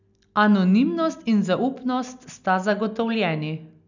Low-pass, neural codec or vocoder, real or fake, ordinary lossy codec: 7.2 kHz; none; real; none